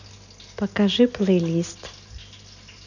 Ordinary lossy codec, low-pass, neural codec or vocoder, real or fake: none; 7.2 kHz; none; real